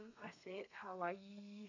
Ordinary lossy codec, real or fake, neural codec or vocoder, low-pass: none; fake; codec, 32 kHz, 1.9 kbps, SNAC; 7.2 kHz